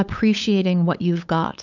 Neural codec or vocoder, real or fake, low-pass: codec, 16 kHz, 8 kbps, FunCodec, trained on LibriTTS, 25 frames a second; fake; 7.2 kHz